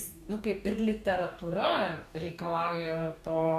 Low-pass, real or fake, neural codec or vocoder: 14.4 kHz; fake; codec, 44.1 kHz, 2.6 kbps, DAC